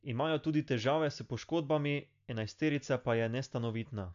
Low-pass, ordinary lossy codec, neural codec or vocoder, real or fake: 7.2 kHz; AAC, 64 kbps; none; real